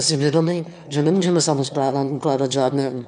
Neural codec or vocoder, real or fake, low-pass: autoencoder, 22.05 kHz, a latent of 192 numbers a frame, VITS, trained on one speaker; fake; 9.9 kHz